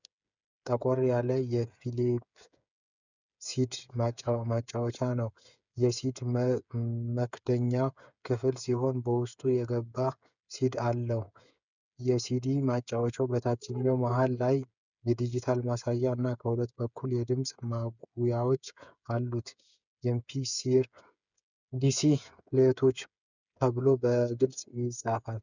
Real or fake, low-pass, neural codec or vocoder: fake; 7.2 kHz; codec, 16 kHz, 8 kbps, FreqCodec, smaller model